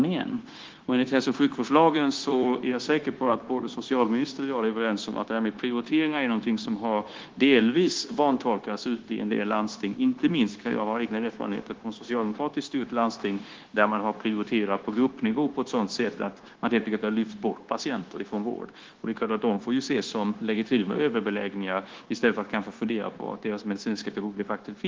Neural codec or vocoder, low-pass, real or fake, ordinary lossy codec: codec, 16 kHz, 0.9 kbps, LongCat-Audio-Codec; 7.2 kHz; fake; Opus, 16 kbps